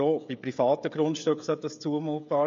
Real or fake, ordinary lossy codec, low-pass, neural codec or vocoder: fake; MP3, 48 kbps; 7.2 kHz; codec, 16 kHz, 16 kbps, FreqCodec, smaller model